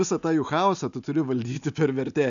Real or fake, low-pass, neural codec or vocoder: real; 7.2 kHz; none